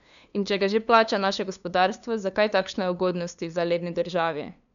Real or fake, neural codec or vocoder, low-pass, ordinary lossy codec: fake; codec, 16 kHz, 2 kbps, FunCodec, trained on LibriTTS, 25 frames a second; 7.2 kHz; MP3, 96 kbps